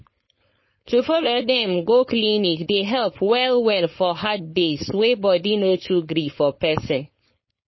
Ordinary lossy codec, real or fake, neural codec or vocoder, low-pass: MP3, 24 kbps; fake; codec, 16 kHz, 4.8 kbps, FACodec; 7.2 kHz